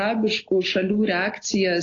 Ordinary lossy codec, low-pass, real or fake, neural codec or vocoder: AAC, 32 kbps; 7.2 kHz; real; none